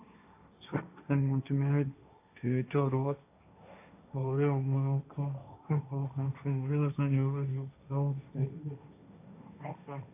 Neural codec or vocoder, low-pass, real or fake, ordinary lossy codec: codec, 16 kHz, 1.1 kbps, Voila-Tokenizer; 3.6 kHz; fake; AAC, 24 kbps